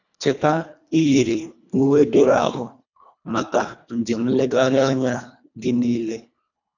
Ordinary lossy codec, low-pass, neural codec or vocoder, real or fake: none; 7.2 kHz; codec, 24 kHz, 1.5 kbps, HILCodec; fake